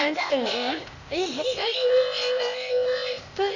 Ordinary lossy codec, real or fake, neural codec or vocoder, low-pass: AAC, 48 kbps; fake; codec, 16 kHz, 0.8 kbps, ZipCodec; 7.2 kHz